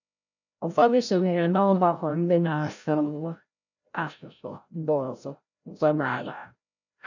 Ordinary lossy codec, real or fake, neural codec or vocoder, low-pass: none; fake; codec, 16 kHz, 0.5 kbps, FreqCodec, larger model; 7.2 kHz